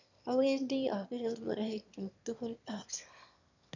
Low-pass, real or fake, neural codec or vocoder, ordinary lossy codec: 7.2 kHz; fake; autoencoder, 22.05 kHz, a latent of 192 numbers a frame, VITS, trained on one speaker; none